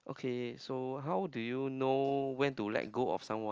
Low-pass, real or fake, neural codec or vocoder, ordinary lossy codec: 7.2 kHz; real; none; Opus, 24 kbps